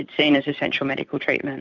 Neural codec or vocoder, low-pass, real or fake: vocoder, 44.1 kHz, 128 mel bands every 256 samples, BigVGAN v2; 7.2 kHz; fake